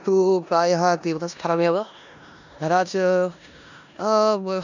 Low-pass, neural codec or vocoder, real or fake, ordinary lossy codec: 7.2 kHz; codec, 16 kHz in and 24 kHz out, 0.9 kbps, LongCat-Audio-Codec, four codebook decoder; fake; none